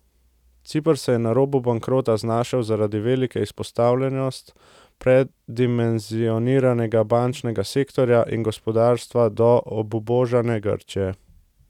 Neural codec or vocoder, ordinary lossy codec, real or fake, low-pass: none; none; real; 19.8 kHz